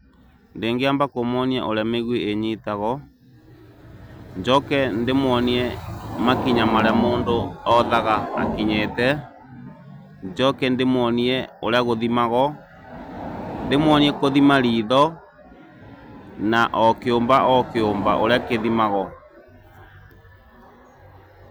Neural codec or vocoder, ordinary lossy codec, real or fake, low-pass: none; none; real; none